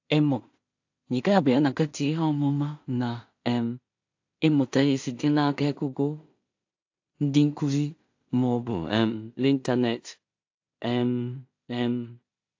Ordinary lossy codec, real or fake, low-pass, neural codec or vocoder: AAC, 48 kbps; fake; 7.2 kHz; codec, 16 kHz in and 24 kHz out, 0.4 kbps, LongCat-Audio-Codec, two codebook decoder